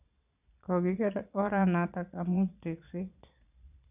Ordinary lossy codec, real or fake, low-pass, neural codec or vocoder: none; fake; 3.6 kHz; vocoder, 22.05 kHz, 80 mel bands, Vocos